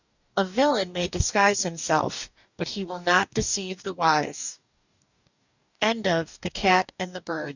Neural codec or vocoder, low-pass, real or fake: codec, 44.1 kHz, 2.6 kbps, DAC; 7.2 kHz; fake